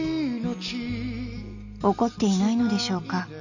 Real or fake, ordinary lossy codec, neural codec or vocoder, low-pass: real; none; none; 7.2 kHz